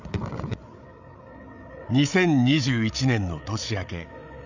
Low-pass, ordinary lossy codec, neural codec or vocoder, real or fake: 7.2 kHz; none; codec, 16 kHz, 8 kbps, FreqCodec, larger model; fake